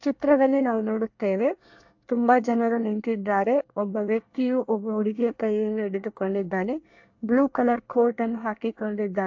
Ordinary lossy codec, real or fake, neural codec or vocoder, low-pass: AAC, 48 kbps; fake; codec, 24 kHz, 1 kbps, SNAC; 7.2 kHz